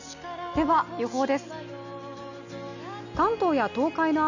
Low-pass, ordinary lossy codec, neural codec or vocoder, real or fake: 7.2 kHz; none; none; real